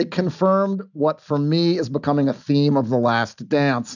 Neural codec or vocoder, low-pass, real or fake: none; 7.2 kHz; real